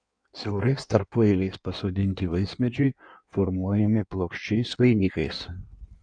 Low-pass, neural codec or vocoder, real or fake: 9.9 kHz; codec, 16 kHz in and 24 kHz out, 1.1 kbps, FireRedTTS-2 codec; fake